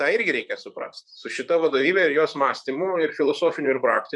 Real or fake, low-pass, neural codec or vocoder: fake; 10.8 kHz; vocoder, 44.1 kHz, 128 mel bands, Pupu-Vocoder